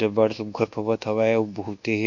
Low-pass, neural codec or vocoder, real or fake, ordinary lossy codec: 7.2 kHz; codec, 24 kHz, 1.2 kbps, DualCodec; fake; none